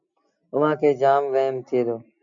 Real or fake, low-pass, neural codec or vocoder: real; 7.2 kHz; none